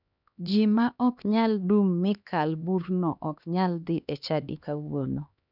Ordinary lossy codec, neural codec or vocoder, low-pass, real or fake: none; codec, 16 kHz, 2 kbps, X-Codec, HuBERT features, trained on LibriSpeech; 5.4 kHz; fake